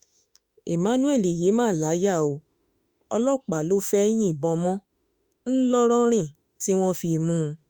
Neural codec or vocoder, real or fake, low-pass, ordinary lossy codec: autoencoder, 48 kHz, 32 numbers a frame, DAC-VAE, trained on Japanese speech; fake; 19.8 kHz; Opus, 64 kbps